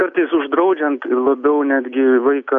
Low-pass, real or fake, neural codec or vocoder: 7.2 kHz; real; none